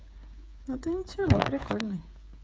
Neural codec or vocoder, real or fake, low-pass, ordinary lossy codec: codec, 16 kHz, 8 kbps, FreqCodec, smaller model; fake; none; none